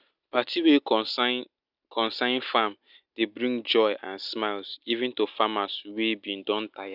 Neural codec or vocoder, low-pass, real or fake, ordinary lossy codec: none; 5.4 kHz; real; none